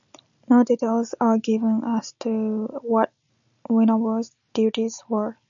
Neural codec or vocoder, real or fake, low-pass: none; real; 7.2 kHz